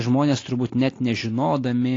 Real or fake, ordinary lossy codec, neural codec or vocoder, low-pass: real; AAC, 32 kbps; none; 7.2 kHz